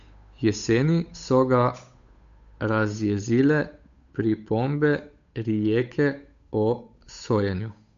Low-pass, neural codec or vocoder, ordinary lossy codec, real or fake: 7.2 kHz; codec, 16 kHz, 8 kbps, FunCodec, trained on Chinese and English, 25 frames a second; MP3, 64 kbps; fake